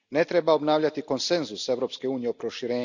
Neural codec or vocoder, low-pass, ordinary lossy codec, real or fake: none; 7.2 kHz; none; real